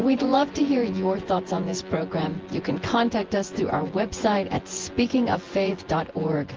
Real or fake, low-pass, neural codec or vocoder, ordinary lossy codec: fake; 7.2 kHz; vocoder, 24 kHz, 100 mel bands, Vocos; Opus, 16 kbps